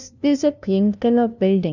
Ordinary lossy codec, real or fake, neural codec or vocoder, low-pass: none; fake; codec, 16 kHz, 0.5 kbps, FunCodec, trained on LibriTTS, 25 frames a second; 7.2 kHz